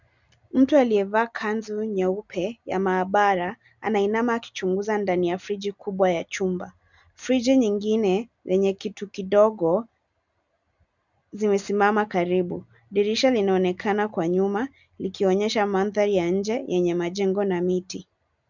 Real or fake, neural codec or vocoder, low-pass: real; none; 7.2 kHz